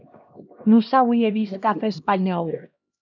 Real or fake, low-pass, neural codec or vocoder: fake; 7.2 kHz; codec, 16 kHz, 1 kbps, X-Codec, HuBERT features, trained on LibriSpeech